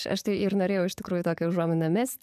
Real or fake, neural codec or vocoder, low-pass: fake; codec, 44.1 kHz, 7.8 kbps, DAC; 14.4 kHz